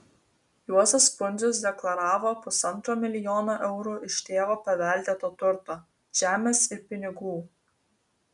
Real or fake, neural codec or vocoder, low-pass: real; none; 10.8 kHz